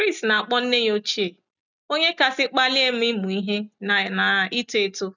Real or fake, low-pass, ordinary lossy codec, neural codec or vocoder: real; 7.2 kHz; none; none